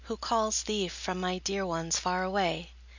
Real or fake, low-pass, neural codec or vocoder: real; 7.2 kHz; none